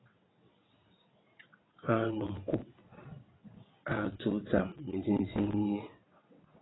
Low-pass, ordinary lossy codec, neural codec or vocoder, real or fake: 7.2 kHz; AAC, 16 kbps; vocoder, 22.05 kHz, 80 mel bands, WaveNeXt; fake